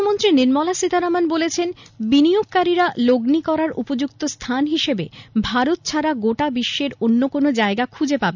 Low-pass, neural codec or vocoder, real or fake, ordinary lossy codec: 7.2 kHz; none; real; none